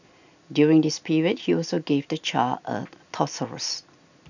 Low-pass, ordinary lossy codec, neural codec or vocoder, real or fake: 7.2 kHz; none; none; real